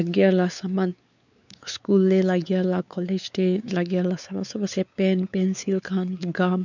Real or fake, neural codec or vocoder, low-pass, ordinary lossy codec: fake; codec, 16 kHz, 4 kbps, X-Codec, WavLM features, trained on Multilingual LibriSpeech; 7.2 kHz; none